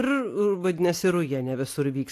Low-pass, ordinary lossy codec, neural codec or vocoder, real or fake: 14.4 kHz; AAC, 64 kbps; none; real